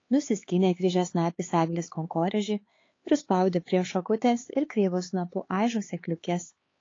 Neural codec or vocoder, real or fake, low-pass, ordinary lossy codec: codec, 16 kHz, 4 kbps, X-Codec, HuBERT features, trained on LibriSpeech; fake; 7.2 kHz; AAC, 32 kbps